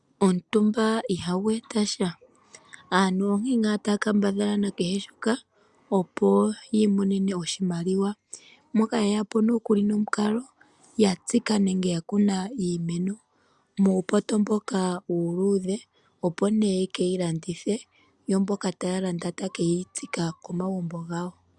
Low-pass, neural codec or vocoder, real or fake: 10.8 kHz; none; real